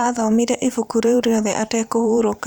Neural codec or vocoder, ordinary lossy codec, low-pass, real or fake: vocoder, 44.1 kHz, 128 mel bands every 512 samples, BigVGAN v2; none; none; fake